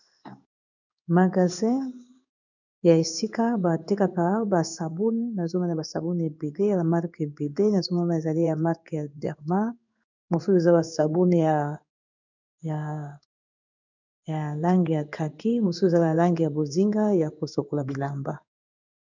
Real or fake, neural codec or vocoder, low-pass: fake; codec, 16 kHz in and 24 kHz out, 1 kbps, XY-Tokenizer; 7.2 kHz